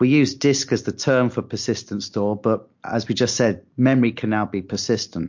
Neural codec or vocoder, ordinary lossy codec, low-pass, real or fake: none; MP3, 48 kbps; 7.2 kHz; real